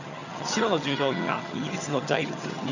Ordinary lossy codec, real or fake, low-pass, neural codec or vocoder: none; fake; 7.2 kHz; vocoder, 22.05 kHz, 80 mel bands, HiFi-GAN